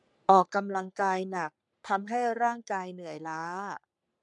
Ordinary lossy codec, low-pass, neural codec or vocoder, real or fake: MP3, 96 kbps; 10.8 kHz; codec, 44.1 kHz, 3.4 kbps, Pupu-Codec; fake